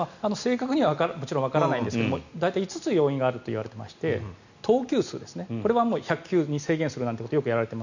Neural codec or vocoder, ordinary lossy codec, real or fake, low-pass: none; none; real; 7.2 kHz